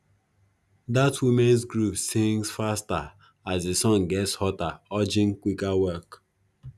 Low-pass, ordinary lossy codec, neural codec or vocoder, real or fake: none; none; none; real